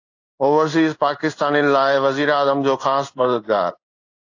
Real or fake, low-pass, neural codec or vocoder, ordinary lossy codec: fake; 7.2 kHz; codec, 16 kHz in and 24 kHz out, 1 kbps, XY-Tokenizer; AAC, 48 kbps